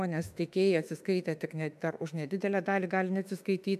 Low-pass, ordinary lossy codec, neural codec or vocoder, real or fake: 14.4 kHz; MP3, 96 kbps; autoencoder, 48 kHz, 32 numbers a frame, DAC-VAE, trained on Japanese speech; fake